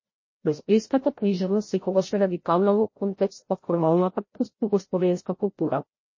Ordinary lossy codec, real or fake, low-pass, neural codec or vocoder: MP3, 32 kbps; fake; 7.2 kHz; codec, 16 kHz, 0.5 kbps, FreqCodec, larger model